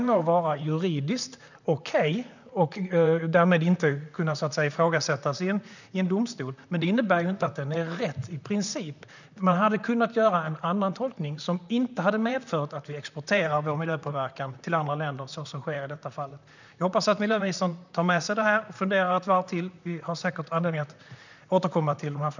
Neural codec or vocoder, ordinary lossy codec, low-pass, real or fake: vocoder, 44.1 kHz, 128 mel bands, Pupu-Vocoder; none; 7.2 kHz; fake